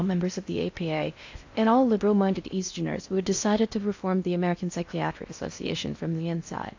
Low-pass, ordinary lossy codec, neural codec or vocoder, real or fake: 7.2 kHz; AAC, 48 kbps; codec, 16 kHz in and 24 kHz out, 0.6 kbps, FocalCodec, streaming, 4096 codes; fake